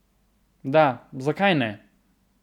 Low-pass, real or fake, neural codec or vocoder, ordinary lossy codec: 19.8 kHz; real; none; none